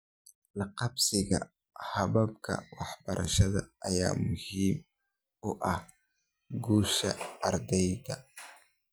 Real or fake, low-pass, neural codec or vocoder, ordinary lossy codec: real; none; none; none